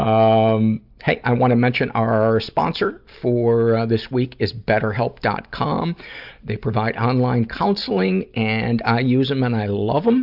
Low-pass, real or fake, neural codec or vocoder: 5.4 kHz; real; none